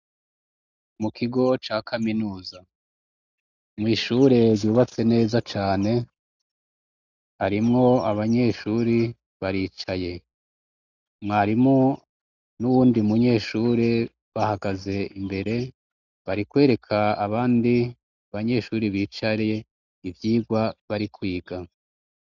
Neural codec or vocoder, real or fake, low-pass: none; real; 7.2 kHz